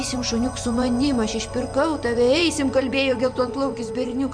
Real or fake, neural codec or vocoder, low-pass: fake; vocoder, 24 kHz, 100 mel bands, Vocos; 9.9 kHz